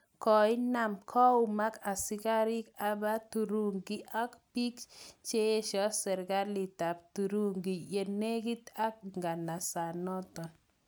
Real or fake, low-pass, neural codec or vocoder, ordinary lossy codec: real; none; none; none